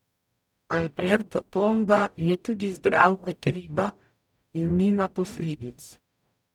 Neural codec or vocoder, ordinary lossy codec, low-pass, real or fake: codec, 44.1 kHz, 0.9 kbps, DAC; none; 19.8 kHz; fake